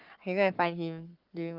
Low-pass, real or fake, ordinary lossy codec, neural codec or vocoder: 5.4 kHz; fake; Opus, 32 kbps; codec, 44.1 kHz, 7.8 kbps, Pupu-Codec